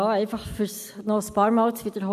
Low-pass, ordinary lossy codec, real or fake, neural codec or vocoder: 14.4 kHz; none; real; none